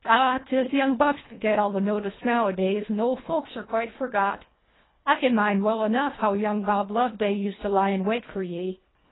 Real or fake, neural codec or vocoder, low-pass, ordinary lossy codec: fake; codec, 24 kHz, 1.5 kbps, HILCodec; 7.2 kHz; AAC, 16 kbps